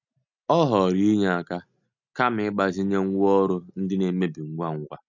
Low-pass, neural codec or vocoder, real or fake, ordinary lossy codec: 7.2 kHz; none; real; none